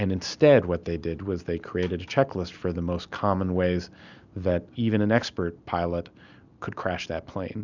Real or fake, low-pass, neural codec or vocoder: real; 7.2 kHz; none